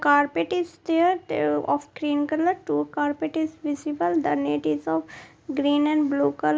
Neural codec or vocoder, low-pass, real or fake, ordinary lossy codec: none; none; real; none